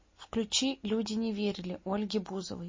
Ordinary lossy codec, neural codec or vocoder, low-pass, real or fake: MP3, 32 kbps; none; 7.2 kHz; real